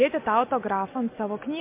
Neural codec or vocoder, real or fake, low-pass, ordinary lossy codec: none; real; 3.6 kHz; MP3, 24 kbps